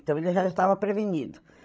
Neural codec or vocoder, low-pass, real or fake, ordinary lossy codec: codec, 16 kHz, 16 kbps, FreqCodec, larger model; none; fake; none